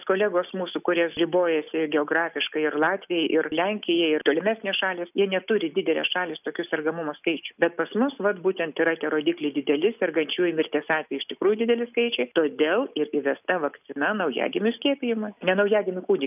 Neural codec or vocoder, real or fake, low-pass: none; real; 3.6 kHz